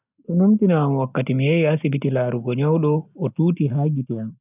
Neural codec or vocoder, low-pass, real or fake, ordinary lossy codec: none; 3.6 kHz; real; none